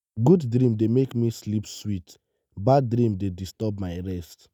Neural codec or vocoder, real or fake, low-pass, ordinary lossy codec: none; real; 19.8 kHz; none